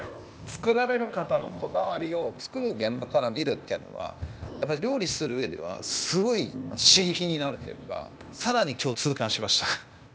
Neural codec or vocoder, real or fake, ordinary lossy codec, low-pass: codec, 16 kHz, 0.8 kbps, ZipCodec; fake; none; none